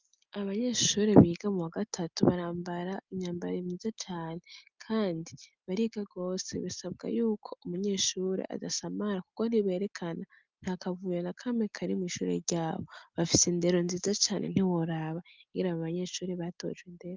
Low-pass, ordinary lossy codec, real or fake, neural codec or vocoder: 7.2 kHz; Opus, 24 kbps; real; none